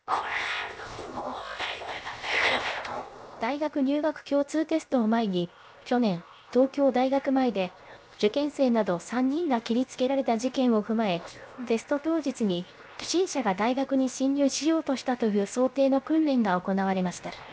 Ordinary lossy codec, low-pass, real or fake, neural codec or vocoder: none; none; fake; codec, 16 kHz, 0.7 kbps, FocalCodec